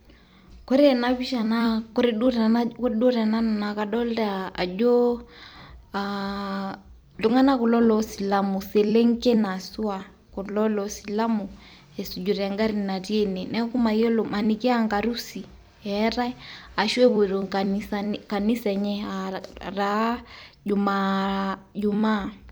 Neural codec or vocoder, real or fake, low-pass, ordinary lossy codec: vocoder, 44.1 kHz, 128 mel bands every 256 samples, BigVGAN v2; fake; none; none